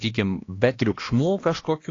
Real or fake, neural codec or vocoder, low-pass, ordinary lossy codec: fake; codec, 16 kHz, 1 kbps, X-Codec, HuBERT features, trained on balanced general audio; 7.2 kHz; AAC, 32 kbps